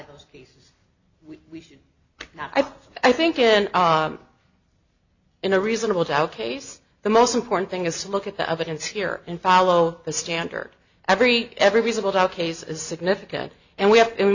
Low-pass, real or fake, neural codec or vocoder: 7.2 kHz; real; none